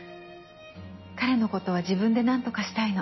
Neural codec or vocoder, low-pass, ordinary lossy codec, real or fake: none; 7.2 kHz; MP3, 24 kbps; real